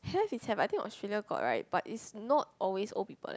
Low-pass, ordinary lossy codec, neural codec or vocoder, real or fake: none; none; none; real